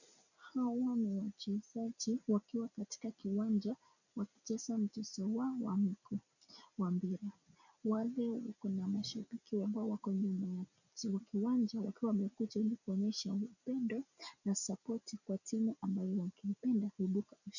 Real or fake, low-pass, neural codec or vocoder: real; 7.2 kHz; none